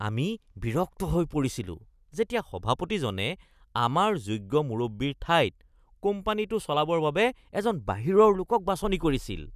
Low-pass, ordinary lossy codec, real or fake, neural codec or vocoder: 14.4 kHz; none; real; none